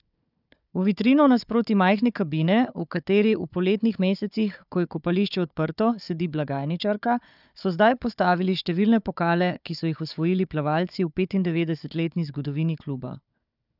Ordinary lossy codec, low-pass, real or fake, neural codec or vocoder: none; 5.4 kHz; fake; codec, 16 kHz, 4 kbps, FunCodec, trained on Chinese and English, 50 frames a second